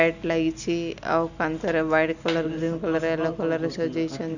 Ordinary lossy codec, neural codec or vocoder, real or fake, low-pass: none; none; real; 7.2 kHz